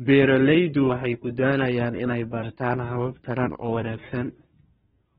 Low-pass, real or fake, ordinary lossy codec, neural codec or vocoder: 10.8 kHz; fake; AAC, 16 kbps; codec, 24 kHz, 1 kbps, SNAC